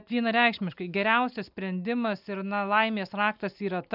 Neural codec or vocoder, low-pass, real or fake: none; 5.4 kHz; real